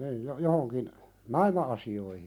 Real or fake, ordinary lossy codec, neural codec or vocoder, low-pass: real; none; none; 19.8 kHz